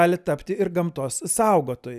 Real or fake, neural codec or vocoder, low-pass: real; none; 14.4 kHz